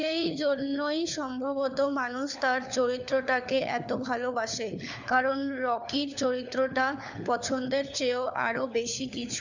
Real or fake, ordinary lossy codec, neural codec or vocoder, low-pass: fake; none; codec, 16 kHz, 4 kbps, FunCodec, trained on LibriTTS, 50 frames a second; 7.2 kHz